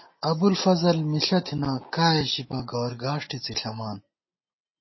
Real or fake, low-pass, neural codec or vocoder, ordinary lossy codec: real; 7.2 kHz; none; MP3, 24 kbps